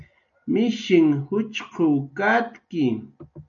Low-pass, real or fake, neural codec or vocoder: 7.2 kHz; real; none